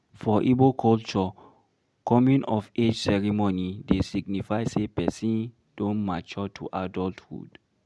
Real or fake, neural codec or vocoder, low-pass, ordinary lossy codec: real; none; none; none